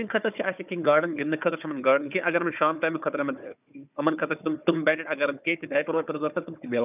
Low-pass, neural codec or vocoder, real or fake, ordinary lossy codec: 3.6 kHz; codec, 16 kHz, 4 kbps, FunCodec, trained on Chinese and English, 50 frames a second; fake; none